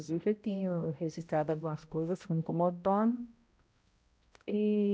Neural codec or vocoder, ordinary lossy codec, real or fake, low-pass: codec, 16 kHz, 0.5 kbps, X-Codec, HuBERT features, trained on balanced general audio; none; fake; none